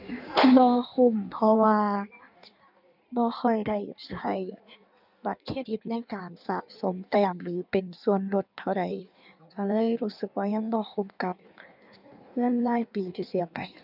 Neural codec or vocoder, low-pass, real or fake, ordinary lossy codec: codec, 16 kHz in and 24 kHz out, 1.1 kbps, FireRedTTS-2 codec; 5.4 kHz; fake; none